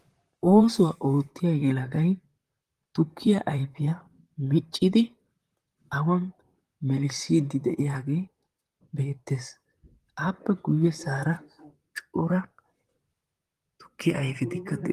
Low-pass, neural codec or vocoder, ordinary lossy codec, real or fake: 14.4 kHz; vocoder, 44.1 kHz, 128 mel bands, Pupu-Vocoder; Opus, 24 kbps; fake